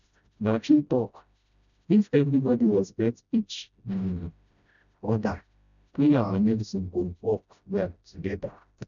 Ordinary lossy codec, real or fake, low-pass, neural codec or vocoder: none; fake; 7.2 kHz; codec, 16 kHz, 0.5 kbps, FreqCodec, smaller model